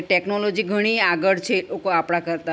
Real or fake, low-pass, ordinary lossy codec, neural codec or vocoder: real; none; none; none